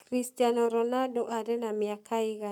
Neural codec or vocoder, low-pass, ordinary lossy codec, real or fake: codec, 44.1 kHz, 7.8 kbps, Pupu-Codec; 19.8 kHz; none; fake